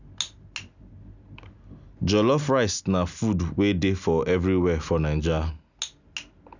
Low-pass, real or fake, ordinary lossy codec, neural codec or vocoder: 7.2 kHz; real; none; none